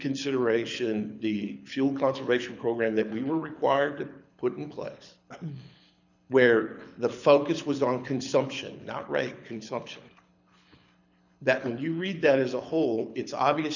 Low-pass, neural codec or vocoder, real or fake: 7.2 kHz; codec, 24 kHz, 6 kbps, HILCodec; fake